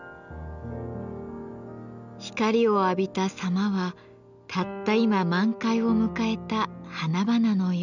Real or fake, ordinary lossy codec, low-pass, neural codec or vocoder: real; none; 7.2 kHz; none